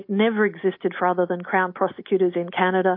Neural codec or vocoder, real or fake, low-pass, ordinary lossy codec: none; real; 5.4 kHz; MP3, 32 kbps